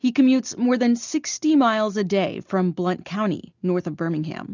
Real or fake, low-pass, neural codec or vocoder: real; 7.2 kHz; none